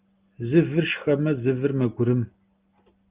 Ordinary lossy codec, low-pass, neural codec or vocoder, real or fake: Opus, 32 kbps; 3.6 kHz; none; real